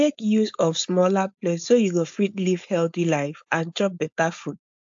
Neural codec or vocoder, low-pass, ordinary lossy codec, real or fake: codec, 16 kHz, 4.8 kbps, FACodec; 7.2 kHz; AAC, 64 kbps; fake